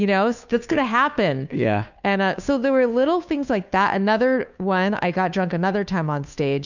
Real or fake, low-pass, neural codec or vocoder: fake; 7.2 kHz; codec, 16 kHz, 2 kbps, FunCodec, trained on Chinese and English, 25 frames a second